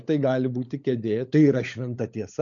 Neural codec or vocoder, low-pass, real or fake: codec, 16 kHz, 16 kbps, FunCodec, trained on LibriTTS, 50 frames a second; 7.2 kHz; fake